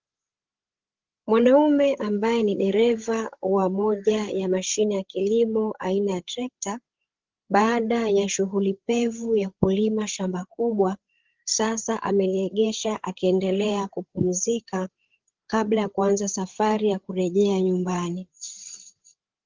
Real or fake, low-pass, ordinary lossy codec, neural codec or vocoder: fake; 7.2 kHz; Opus, 16 kbps; codec, 16 kHz, 8 kbps, FreqCodec, larger model